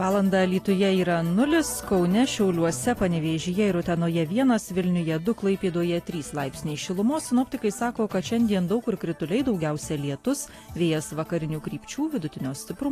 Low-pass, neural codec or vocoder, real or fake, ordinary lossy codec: 14.4 kHz; none; real; AAC, 48 kbps